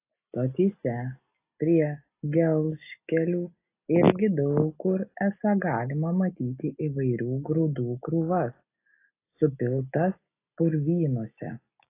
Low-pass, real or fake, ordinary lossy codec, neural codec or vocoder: 3.6 kHz; real; AAC, 24 kbps; none